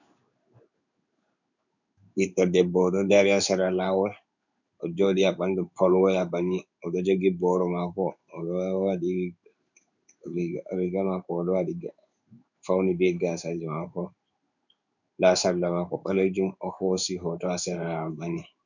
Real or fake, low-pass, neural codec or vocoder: fake; 7.2 kHz; codec, 16 kHz in and 24 kHz out, 1 kbps, XY-Tokenizer